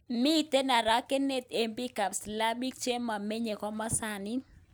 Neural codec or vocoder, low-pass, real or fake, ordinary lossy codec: none; none; real; none